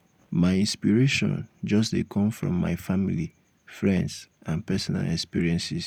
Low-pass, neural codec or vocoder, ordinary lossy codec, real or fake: 19.8 kHz; none; none; real